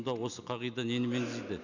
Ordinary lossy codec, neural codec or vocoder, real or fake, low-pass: none; none; real; 7.2 kHz